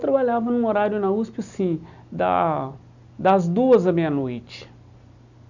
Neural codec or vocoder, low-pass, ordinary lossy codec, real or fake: none; 7.2 kHz; none; real